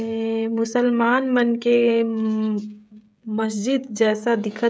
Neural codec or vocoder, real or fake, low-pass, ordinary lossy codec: codec, 16 kHz, 16 kbps, FreqCodec, smaller model; fake; none; none